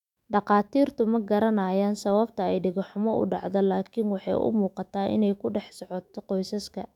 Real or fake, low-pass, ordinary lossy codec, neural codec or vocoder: fake; 19.8 kHz; none; autoencoder, 48 kHz, 128 numbers a frame, DAC-VAE, trained on Japanese speech